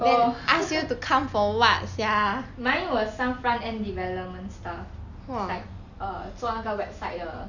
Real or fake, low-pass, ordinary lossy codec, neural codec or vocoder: real; 7.2 kHz; none; none